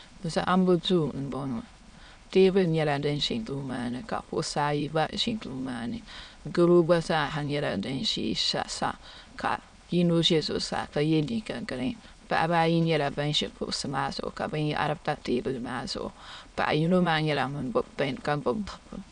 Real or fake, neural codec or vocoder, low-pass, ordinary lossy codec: fake; autoencoder, 22.05 kHz, a latent of 192 numbers a frame, VITS, trained on many speakers; 9.9 kHz; MP3, 96 kbps